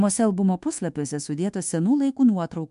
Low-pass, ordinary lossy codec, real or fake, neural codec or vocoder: 10.8 kHz; MP3, 64 kbps; fake; codec, 24 kHz, 1.2 kbps, DualCodec